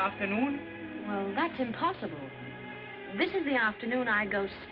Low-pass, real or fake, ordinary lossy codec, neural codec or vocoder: 5.4 kHz; real; Opus, 32 kbps; none